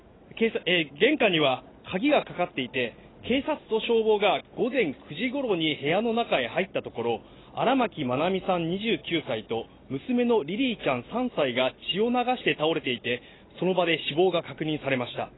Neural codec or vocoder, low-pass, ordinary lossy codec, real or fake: none; 7.2 kHz; AAC, 16 kbps; real